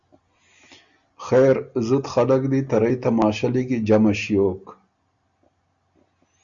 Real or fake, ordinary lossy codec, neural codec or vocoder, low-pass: real; Opus, 64 kbps; none; 7.2 kHz